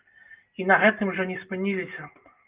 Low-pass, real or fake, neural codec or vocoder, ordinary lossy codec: 3.6 kHz; real; none; Opus, 24 kbps